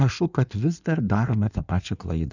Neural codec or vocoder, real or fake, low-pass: codec, 16 kHz in and 24 kHz out, 1.1 kbps, FireRedTTS-2 codec; fake; 7.2 kHz